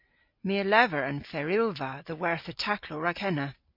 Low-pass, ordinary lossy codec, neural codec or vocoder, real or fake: 5.4 kHz; MP3, 32 kbps; none; real